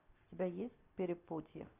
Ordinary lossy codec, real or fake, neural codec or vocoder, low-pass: AAC, 24 kbps; real; none; 3.6 kHz